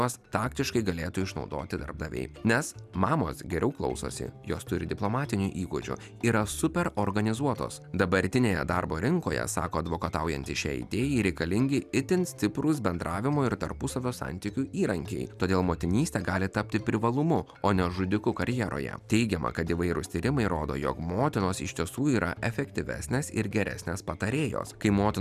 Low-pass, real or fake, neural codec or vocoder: 14.4 kHz; real; none